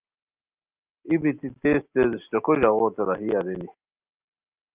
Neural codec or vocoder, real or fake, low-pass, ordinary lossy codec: none; real; 3.6 kHz; Opus, 32 kbps